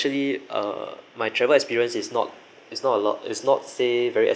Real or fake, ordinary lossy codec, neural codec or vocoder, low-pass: real; none; none; none